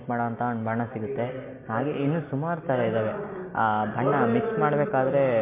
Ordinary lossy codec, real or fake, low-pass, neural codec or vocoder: MP3, 24 kbps; real; 3.6 kHz; none